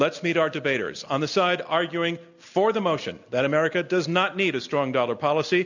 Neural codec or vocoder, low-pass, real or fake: none; 7.2 kHz; real